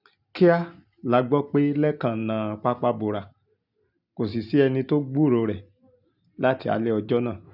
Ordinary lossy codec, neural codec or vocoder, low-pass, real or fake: none; none; 5.4 kHz; real